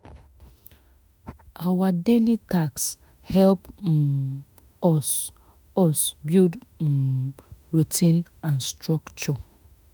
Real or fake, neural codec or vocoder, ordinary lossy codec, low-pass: fake; autoencoder, 48 kHz, 32 numbers a frame, DAC-VAE, trained on Japanese speech; none; none